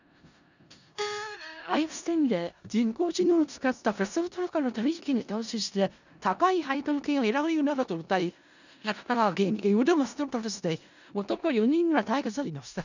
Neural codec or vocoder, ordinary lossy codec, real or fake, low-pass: codec, 16 kHz in and 24 kHz out, 0.4 kbps, LongCat-Audio-Codec, four codebook decoder; none; fake; 7.2 kHz